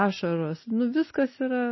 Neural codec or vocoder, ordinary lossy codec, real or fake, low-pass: none; MP3, 24 kbps; real; 7.2 kHz